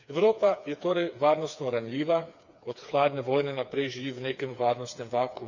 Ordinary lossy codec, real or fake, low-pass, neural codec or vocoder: none; fake; 7.2 kHz; codec, 16 kHz, 4 kbps, FreqCodec, smaller model